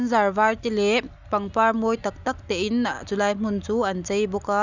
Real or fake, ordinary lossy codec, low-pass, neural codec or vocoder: real; none; 7.2 kHz; none